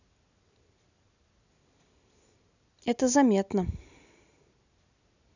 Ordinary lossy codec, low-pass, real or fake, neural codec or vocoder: none; 7.2 kHz; real; none